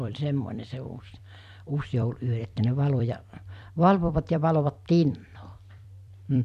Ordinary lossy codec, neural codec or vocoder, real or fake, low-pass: AAC, 96 kbps; vocoder, 44.1 kHz, 128 mel bands every 256 samples, BigVGAN v2; fake; 14.4 kHz